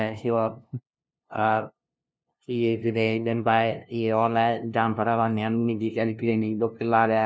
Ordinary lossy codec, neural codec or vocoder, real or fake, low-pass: none; codec, 16 kHz, 0.5 kbps, FunCodec, trained on LibriTTS, 25 frames a second; fake; none